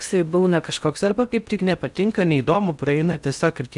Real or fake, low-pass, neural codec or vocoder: fake; 10.8 kHz; codec, 16 kHz in and 24 kHz out, 0.6 kbps, FocalCodec, streaming, 4096 codes